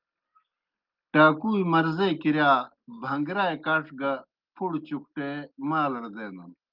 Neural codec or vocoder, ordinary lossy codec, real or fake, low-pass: none; Opus, 32 kbps; real; 5.4 kHz